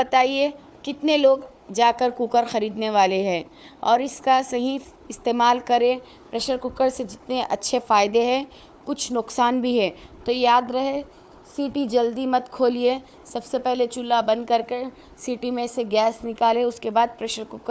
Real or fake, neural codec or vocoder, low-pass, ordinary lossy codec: fake; codec, 16 kHz, 4 kbps, FunCodec, trained on Chinese and English, 50 frames a second; none; none